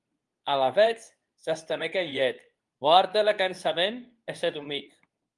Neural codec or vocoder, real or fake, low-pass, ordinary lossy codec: codec, 24 kHz, 0.9 kbps, WavTokenizer, medium speech release version 2; fake; 10.8 kHz; Opus, 32 kbps